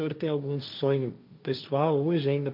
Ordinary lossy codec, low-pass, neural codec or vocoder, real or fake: MP3, 48 kbps; 5.4 kHz; codec, 16 kHz, 1.1 kbps, Voila-Tokenizer; fake